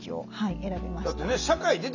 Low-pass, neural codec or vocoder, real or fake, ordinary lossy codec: 7.2 kHz; none; real; none